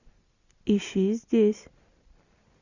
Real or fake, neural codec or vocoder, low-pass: real; none; 7.2 kHz